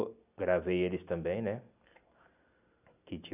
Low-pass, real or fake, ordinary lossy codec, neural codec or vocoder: 3.6 kHz; real; none; none